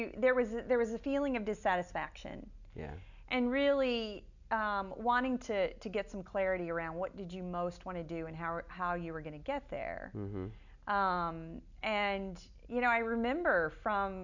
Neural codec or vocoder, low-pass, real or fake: none; 7.2 kHz; real